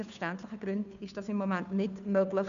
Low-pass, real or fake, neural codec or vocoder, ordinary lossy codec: 7.2 kHz; fake; codec, 16 kHz, 2 kbps, FunCodec, trained on Chinese and English, 25 frames a second; none